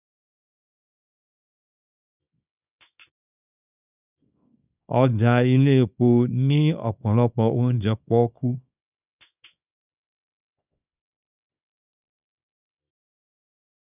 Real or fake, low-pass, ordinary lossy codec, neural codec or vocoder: fake; 3.6 kHz; none; codec, 24 kHz, 0.9 kbps, WavTokenizer, small release